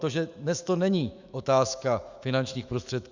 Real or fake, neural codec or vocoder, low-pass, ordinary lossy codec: fake; autoencoder, 48 kHz, 128 numbers a frame, DAC-VAE, trained on Japanese speech; 7.2 kHz; Opus, 64 kbps